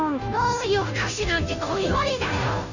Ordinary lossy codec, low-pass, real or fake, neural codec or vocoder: none; 7.2 kHz; fake; codec, 16 kHz, 0.5 kbps, FunCodec, trained on Chinese and English, 25 frames a second